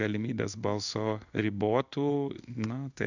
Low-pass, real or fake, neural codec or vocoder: 7.2 kHz; fake; codec, 16 kHz in and 24 kHz out, 1 kbps, XY-Tokenizer